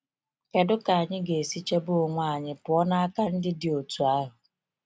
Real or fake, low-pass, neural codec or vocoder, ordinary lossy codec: real; none; none; none